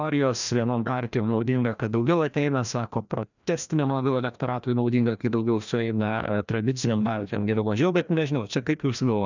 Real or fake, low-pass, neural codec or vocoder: fake; 7.2 kHz; codec, 16 kHz, 1 kbps, FreqCodec, larger model